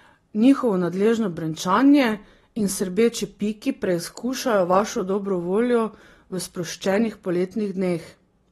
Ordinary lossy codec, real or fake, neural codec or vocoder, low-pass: AAC, 32 kbps; real; none; 14.4 kHz